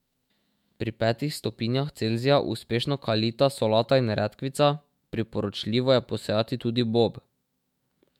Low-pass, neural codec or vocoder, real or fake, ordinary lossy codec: 19.8 kHz; autoencoder, 48 kHz, 128 numbers a frame, DAC-VAE, trained on Japanese speech; fake; MP3, 96 kbps